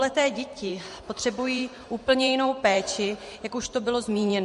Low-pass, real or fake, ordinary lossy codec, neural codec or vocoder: 14.4 kHz; fake; MP3, 48 kbps; vocoder, 44.1 kHz, 128 mel bands every 512 samples, BigVGAN v2